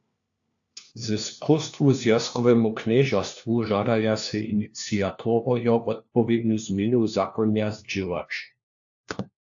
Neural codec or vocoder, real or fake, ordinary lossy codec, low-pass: codec, 16 kHz, 1 kbps, FunCodec, trained on LibriTTS, 50 frames a second; fake; AAC, 64 kbps; 7.2 kHz